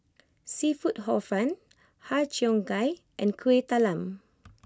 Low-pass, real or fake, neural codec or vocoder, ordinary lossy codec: none; real; none; none